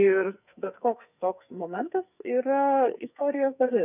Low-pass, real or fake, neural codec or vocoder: 3.6 kHz; fake; codec, 44.1 kHz, 2.6 kbps, SNAC